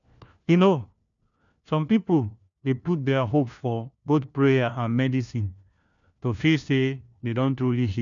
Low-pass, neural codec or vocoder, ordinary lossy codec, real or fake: 7.2 kHz; codec, 16 kHz, 1 kbps, FunCodec, trained on LibriTTS, 50 frames a second; MP3, 96 kbps; fake